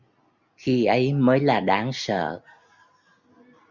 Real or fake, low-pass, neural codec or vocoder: real; 7.2 kHz; none